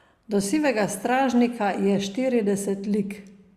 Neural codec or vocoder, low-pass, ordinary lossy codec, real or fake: none; 14.4 kHz; Opus, 64 kbps; real